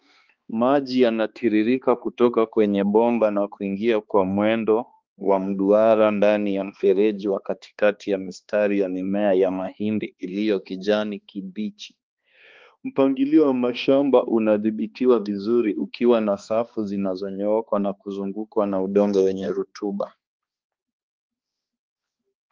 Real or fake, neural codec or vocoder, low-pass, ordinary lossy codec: fake; codec, 16 kHz, 2 kbps, X-Codec, HuBERT features, trained on balanced general audio; 7.2 kHz; Opus, 32 kbps